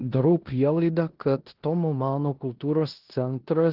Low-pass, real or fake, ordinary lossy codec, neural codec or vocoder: 5.4 kHz; fake; Opus, 16 kbps; codec, 16 kHz in and 24 kHz out, 0.9 kbps, LongCat-Audio-Codec, four codebook decoder